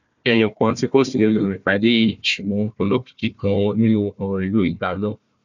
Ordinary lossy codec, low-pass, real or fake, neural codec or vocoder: none; 7.2 kHz; fake; codec, 16 kHz, 1 kbps, FunCodec, trained on Chinese and English, 50 frames a second